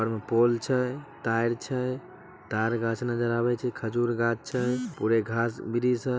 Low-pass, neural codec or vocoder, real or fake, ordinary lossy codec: none; none; real; none